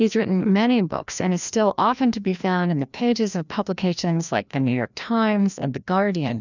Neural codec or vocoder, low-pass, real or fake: codec, 16 kHz, 1 kbps, FreqCodec, larger model; 7.2 kHz; fake